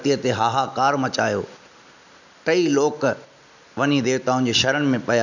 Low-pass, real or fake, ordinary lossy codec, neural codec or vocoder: 7.2 kHz; real; none; none